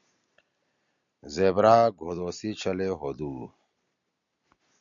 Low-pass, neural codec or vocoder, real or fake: 7.2 kHz; none; real